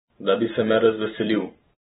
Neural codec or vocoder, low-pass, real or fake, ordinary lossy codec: vocoder, 44.1 kHz, 128 mel bands every 512 samples, BigVGAN v2; 19.8 kHz; fake; AAC, 16 kbps